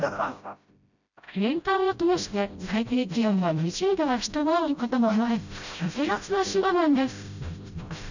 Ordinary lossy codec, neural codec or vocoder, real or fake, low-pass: none; codec, 16 kHz, 0.5 kbps, FreqCodec, smaller model; fake; 7.2 kHz